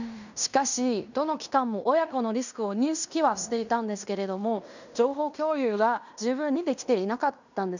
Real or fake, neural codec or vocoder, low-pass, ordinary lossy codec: fake; codec, 16 kHz in and 24 kHz out, 0.9 kbps, LongCat-Audio-Codec, fine tuned four codebook decoder; 7.2 kHz; none